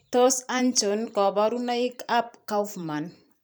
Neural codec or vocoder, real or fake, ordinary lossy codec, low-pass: vocoder, 44.1 kHz, 128 mel bands every 512 samples, BigVGAN v2; fake; none; none